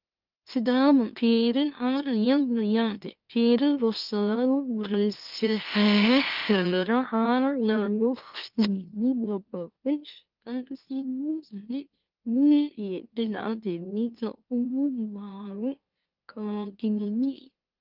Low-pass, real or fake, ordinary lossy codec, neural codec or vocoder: 5.4 kHz; fake; Opus, 24 kbps; autoencoder, 44.1 kHz, a latent of 192 numbers a frame, MeloTTS